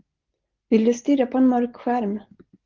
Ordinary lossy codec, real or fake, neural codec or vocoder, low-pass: Opus, 16 kbps; real; none; 7.2 kHz